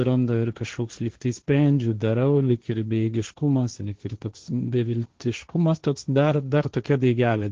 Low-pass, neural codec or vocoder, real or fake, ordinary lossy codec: 7.2 kHz; codec, 16 kHz, 1.1 kbps, Voila-Tokenizer; fake; Opus, 16 kbps